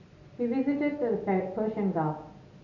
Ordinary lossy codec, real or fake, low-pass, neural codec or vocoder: AAC, 48 kbps; real; 7.2 kHz; none